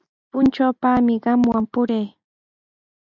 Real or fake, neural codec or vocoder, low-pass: real; none; 7.2 kHz